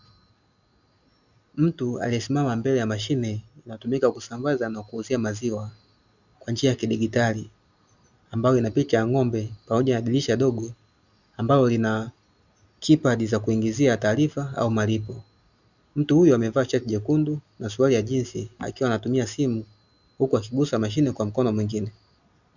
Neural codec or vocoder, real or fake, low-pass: none; real; 7.2 kHz